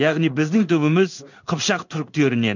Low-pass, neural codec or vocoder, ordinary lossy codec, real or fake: 7.2 kHz; codec, 16 kHz in and 24 kHz out, 1 kbps, XY-Tokenizer; none; fake